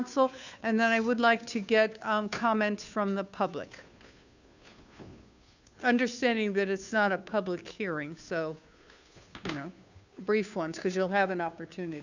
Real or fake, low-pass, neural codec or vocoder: fake; 7.2 kHz; codec, 16 kHz, 2 kbps, FunCodec, trained on Chinese and English, 25 frames a second